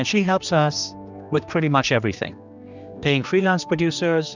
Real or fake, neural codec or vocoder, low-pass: fake; codec, 16 kHz, 2 kbps, X-Codec, HuBERT features, trained on general audio; 7.2 kHz